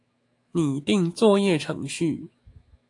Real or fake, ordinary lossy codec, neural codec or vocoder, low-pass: fake; AAC, 48 kbps; autoencoder, 48 kHz, 128 numbers a frame, DAC-VAE, trained on Japanese speech; 10.8 kHz